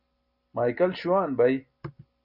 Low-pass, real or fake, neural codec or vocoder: 5.4 kHz; real; none